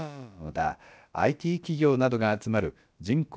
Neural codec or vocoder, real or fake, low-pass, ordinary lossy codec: codec, 16 kHz, about 1 kbps, DyCAST, with the encoder's durations; fake; none; none